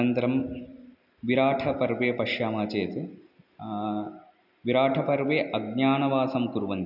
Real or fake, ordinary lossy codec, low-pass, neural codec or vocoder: real; none; 5.4 kHz; none